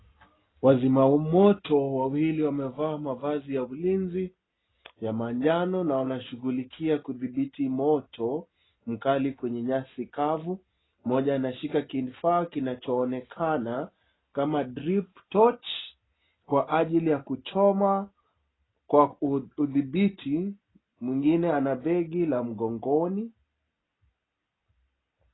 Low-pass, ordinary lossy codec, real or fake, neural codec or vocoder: 7.2 kHz; AAC, 16 kbps; real; none